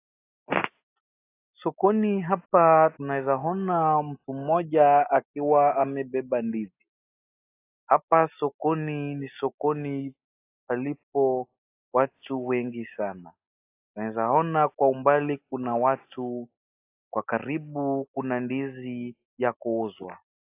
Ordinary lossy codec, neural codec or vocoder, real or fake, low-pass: AAC, 24 kbps; none; real; 3.6 kHz